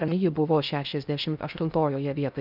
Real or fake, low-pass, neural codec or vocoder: fake; 5.4 kHz; codec, 16 kHz in and 24 kHz out, 0.6 kbps, FocalCodec, streaming, 4096 codes